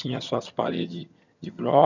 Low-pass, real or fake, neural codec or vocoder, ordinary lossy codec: 7.2 kHz; fake; vocoder, 22.05 kHz, 80 mel bands, HiFi-GAN; none